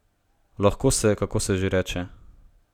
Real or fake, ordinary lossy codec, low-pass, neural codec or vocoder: real; none; 19.8 kHz; none